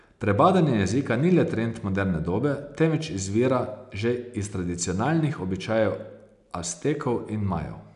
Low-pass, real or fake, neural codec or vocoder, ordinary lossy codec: 10.8 kHz; real; none; none